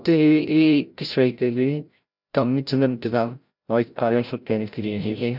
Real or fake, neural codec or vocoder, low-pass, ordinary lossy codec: fake; codec, 16 kHz, 0.5 kbps, FreqCodec, larger model; 5.4 kHz; none